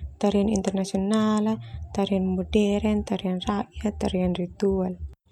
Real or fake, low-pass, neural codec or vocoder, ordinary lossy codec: real; 19.8 kHz; none; MP3, 96 kbps